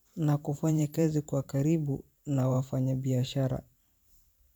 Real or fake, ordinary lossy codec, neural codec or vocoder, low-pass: fake; none; vocoder, 44.1 kHz, 128 mel bands every 512 samples, BigVGAN v2; none